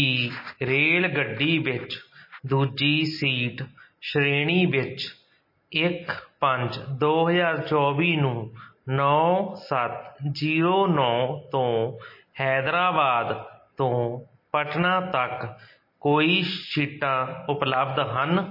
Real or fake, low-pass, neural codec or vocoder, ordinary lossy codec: real; 5.4 kHz; none; MP3, 24 kbps